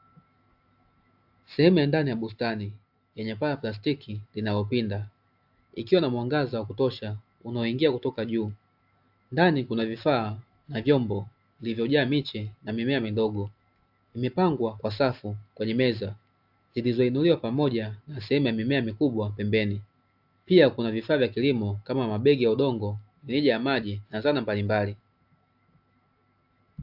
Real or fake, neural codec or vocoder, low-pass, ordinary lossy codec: real; none; 5.4 kHz; AAC, 48 kbps